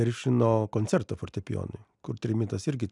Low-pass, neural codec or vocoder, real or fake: 10.8 kHz; none; real